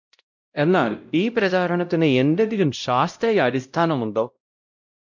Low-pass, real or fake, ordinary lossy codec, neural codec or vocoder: 7.2 kHz; fake; MP3, 64 kbps; codec, 16 kHz, 0.5 kbps, X-Codec, WavLM features, trained on Multilingual LibriSpeech